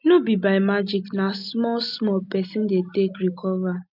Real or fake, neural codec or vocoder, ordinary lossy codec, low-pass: real; none; none; 5.4 kHz